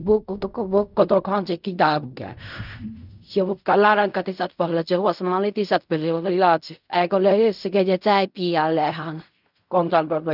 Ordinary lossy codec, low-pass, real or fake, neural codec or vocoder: none; 5.4 kHz; fake; codec, 16 kHz in and 24 kHz out, 0.4 kbps, LongCat-Audio-Codec, fine tuned four codebook decoder